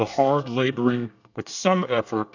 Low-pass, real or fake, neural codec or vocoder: 7.2 kHz; fake; codec, 24 kHz, 1 kbps, SNAC